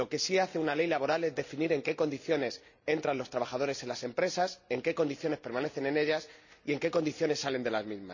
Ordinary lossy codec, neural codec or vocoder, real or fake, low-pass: none; none; real; 7.2 kHz